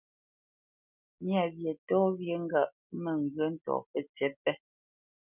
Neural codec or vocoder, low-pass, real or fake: none; 3.6 kHz; real